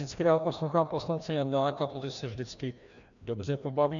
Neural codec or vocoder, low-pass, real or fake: codec, 16 kHz, 1 kbps, FreqCodec, larger model; 7.2 kHz; fake